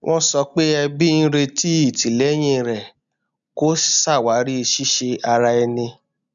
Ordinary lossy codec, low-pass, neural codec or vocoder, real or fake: none; 7.2 kHz; none; real